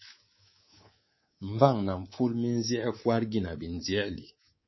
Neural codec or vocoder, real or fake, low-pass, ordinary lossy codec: codec, 24 kHz, 3.1 kbps, DualCodec; fake; 7.2 kHz; MP3, 24 kbps